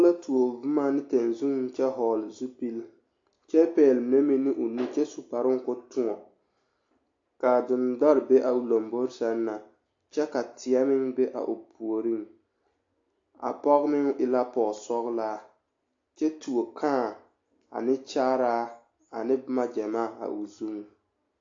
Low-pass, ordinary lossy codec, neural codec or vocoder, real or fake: 7.2 kHz; AAC, 48 kbps; none; real